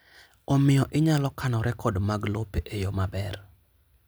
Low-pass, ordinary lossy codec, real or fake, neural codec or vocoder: none; none; real; none